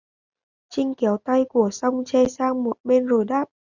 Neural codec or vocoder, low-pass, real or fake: none; 7.2 kHz; real